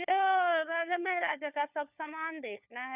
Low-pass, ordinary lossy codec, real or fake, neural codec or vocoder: 3.6 kHz; none; fake; codec, 16 kHz, 4 kbps, FunCodec, trained on LibriTTS, 50 frames a second